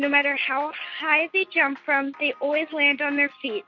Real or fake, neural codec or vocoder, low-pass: real; none; 7.2 kHz